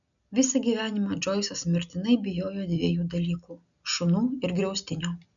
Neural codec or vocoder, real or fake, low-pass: none; real; 7.2 kHz